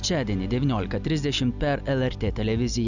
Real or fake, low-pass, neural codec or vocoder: real; 7.2 kHz; none